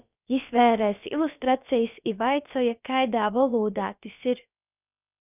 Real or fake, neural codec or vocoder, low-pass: fake; codec, 16 kHz, about 1 kbps, DyCAST, with the encoder's durations; 3.6 kHz